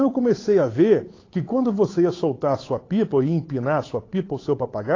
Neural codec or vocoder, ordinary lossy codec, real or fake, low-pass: codec, 16 kHz, 8 kbps, FunCodec, trained on Chinese and English, 25 frames a second; AAC, 32 kbps; fake; 7.2 kHz